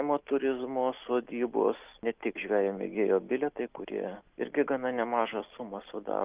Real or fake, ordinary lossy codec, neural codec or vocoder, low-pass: real; Opus, 24 kbps; none; 3.6 kHz